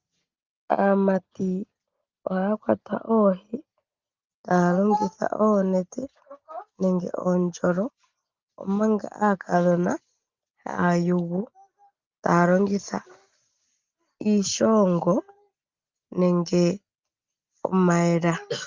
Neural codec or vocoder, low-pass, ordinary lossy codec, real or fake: none; 7.2 kHz; Opus, 32 kbps; real